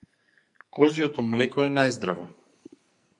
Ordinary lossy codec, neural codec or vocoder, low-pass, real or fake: MP3, 64 kbps; codec, 44.1 kHz, 2.6 kbps, SNAC; 10.8 kHz; fake